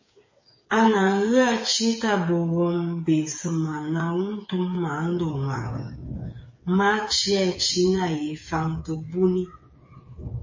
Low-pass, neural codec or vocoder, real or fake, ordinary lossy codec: 7.2 kHz; codec, 16 kHz, 8 kbps, FreqCodec, smaller model; fake; MP3, 32 kbps